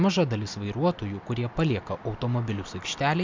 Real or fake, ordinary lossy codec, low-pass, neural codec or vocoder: real; MP3, 64 kbps; 7.2 kHz; none